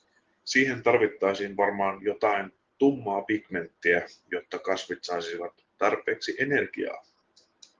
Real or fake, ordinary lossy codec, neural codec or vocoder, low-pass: real; Opus, 16 kbps; none; 7.2 kHz